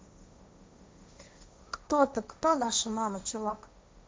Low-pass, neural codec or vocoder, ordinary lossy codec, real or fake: none; codec, 16 kHz, 1.1 kbps, Voila-Tokenizer; none; fake